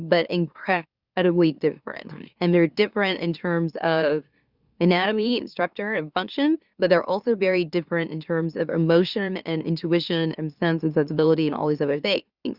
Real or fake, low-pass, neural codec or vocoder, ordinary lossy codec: fake; 5.4 kHz; autoencoder, 44.1 kHz, a latent of 192 numbers a frame, MeloTTS; Opus, 64 kbps